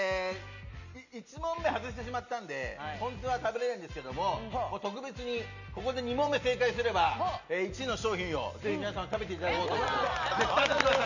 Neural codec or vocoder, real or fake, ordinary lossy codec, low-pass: none; real; none; 7.2 kHz